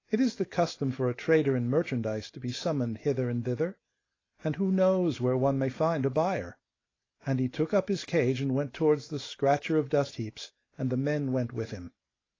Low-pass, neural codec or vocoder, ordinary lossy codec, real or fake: 7.2 kHz; none; AAC, 32 kbps; real